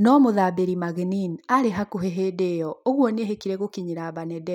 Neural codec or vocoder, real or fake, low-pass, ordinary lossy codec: none; real; 19.8 kHz; none